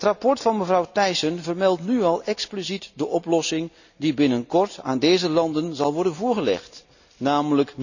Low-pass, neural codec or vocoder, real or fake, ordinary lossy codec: 7.2 kHz; none; real; none